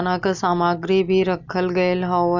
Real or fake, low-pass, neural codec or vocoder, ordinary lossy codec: real; 7.2 kHz; none; none